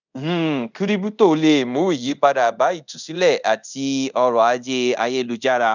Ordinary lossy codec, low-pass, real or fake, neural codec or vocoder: none; 7.2 kHz; fake; codec, 24 kHz, 0.5 kbps, DualCodec